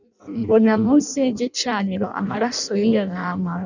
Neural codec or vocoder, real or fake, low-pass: codec, 16 kHz in and 24 kHz out, 0.6 kbps, FireRedTTS-2 codec; fake; 7.2 kHz